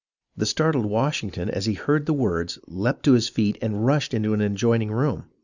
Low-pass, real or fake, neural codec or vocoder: 7.2 kHz; real; none